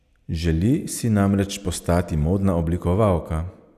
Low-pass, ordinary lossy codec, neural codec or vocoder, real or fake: 14.4 kHz; none; none; real